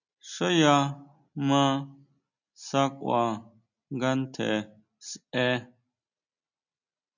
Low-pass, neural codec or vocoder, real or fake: 7.2 kHz; none; real